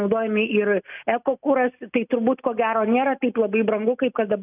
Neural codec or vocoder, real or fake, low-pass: none; real; 3.6 kHz